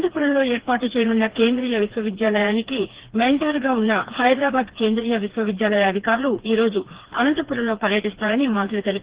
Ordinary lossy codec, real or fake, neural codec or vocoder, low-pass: Opus, 16 kbps; fake; codec, 16 kHz, 2 kbps, FreqCodec, smaller model; 3.6 kHz